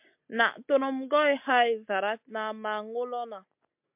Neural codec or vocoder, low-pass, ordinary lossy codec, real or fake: none; 3.6 kHz; MP3, 32 kbps; real